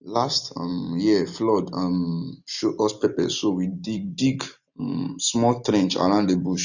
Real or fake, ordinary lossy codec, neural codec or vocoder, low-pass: real; none; none; 7.2 kHz